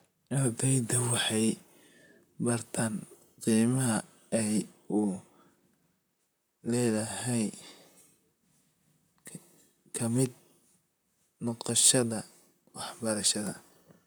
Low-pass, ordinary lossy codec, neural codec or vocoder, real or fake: none; none; vocoder, 44.1 kHz, 128 mel bands, Pupu-Vocoder; fake